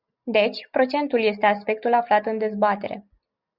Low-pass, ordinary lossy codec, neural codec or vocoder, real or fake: 5.4 kHz; Opus, 64 kbps; none; real